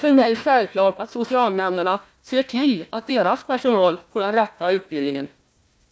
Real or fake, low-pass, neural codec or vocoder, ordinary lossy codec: fake; none; codec, 16 kHz, 1 kbps, FunCodec, trained on Chinese and English, 50 frames a second; none